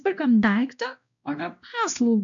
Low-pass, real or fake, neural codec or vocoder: 7.2 kHz; fake; codec, 16 kHz, 1 kbps, X-Codec, WavLM features, trained on Multilingual LibriSpeech